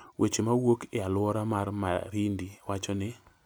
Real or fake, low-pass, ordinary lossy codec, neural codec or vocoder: real; none; none; none